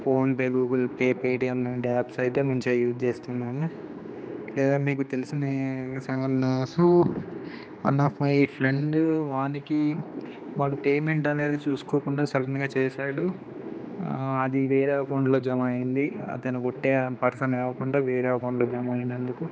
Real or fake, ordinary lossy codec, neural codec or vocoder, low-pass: fake; none; codec, 16 kHz, 2 kbps, X-Codec, HuBERT features, trained on general audio; none